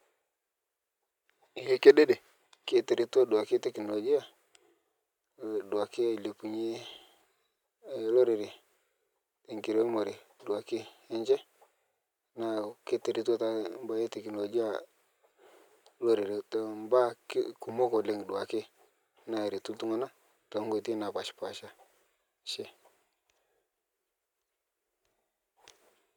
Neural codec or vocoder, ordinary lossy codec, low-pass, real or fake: none; none; 19.8 kHz; real